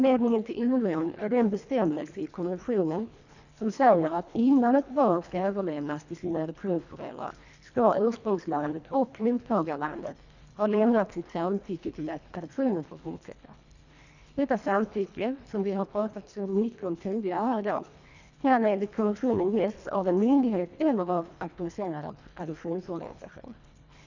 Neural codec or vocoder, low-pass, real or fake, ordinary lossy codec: codec, 24 kHz, 1.5 kbps, HILCodec; 7.2 kHz; fake; none